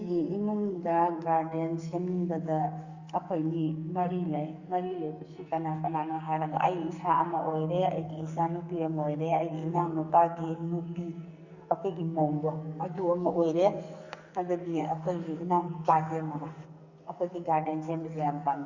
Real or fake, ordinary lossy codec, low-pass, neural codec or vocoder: fake; Opus, 64 kbps; 7.2 kHz; codec, 44.1 kHz, 2.6 kbps, SNAC